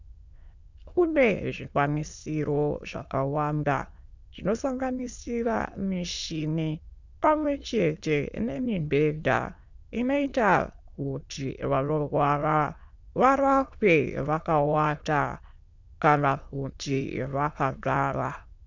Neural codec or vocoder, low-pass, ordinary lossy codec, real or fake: autoencoder, 22.05 kHz, a latent of 192 numbers a frame, VITS, trained on many speakers; 7.2 kHz; Opus, 64 kbps; fake